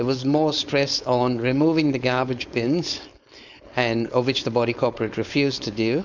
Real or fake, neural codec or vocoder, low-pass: fake; codec, 16 kHz, 4.8 kbps, FACodec; 7.2 kHz